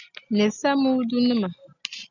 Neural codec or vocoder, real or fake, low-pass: none; real; 7.2 kHz